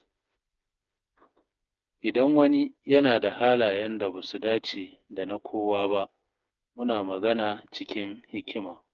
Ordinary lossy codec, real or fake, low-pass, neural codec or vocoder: Opus, 24 kbps; fake; 7.2 kHz; codec, 16 kHz, 4 kbps, FreqCodec, smaller model